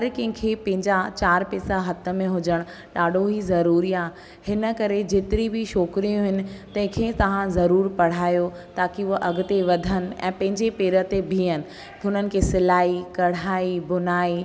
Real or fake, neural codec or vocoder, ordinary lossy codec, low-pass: real; none; none; none